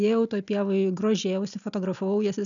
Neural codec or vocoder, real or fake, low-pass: none; real; 7.2 kHz